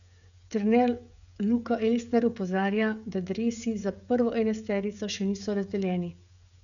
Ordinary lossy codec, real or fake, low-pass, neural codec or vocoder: none; fake; 7.2 kHz; codec, 16 kHz, 16 kbps, FreqCodec, smaller model